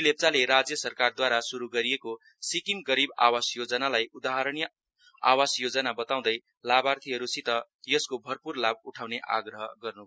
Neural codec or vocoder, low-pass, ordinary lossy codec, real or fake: none; none; none; real